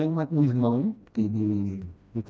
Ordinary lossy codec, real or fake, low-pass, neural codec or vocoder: none; fake; none; codec, 16 kHz, 1 kbps, FreqCodec, smaller model